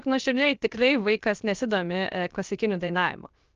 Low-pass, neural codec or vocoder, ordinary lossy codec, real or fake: 7.2 kHz; codec, 16 kHz, 0.7 kbps, FocalCodec; Opus, 24 kbps; fake